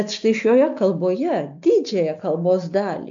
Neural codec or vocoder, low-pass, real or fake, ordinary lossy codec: none; 7.2 kHz; real; AAC, 64 kbps